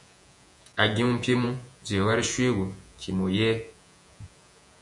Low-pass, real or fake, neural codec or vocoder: 10.8 kHz; fake; vocoder, 48 kHz, 128 mel bands, Vocos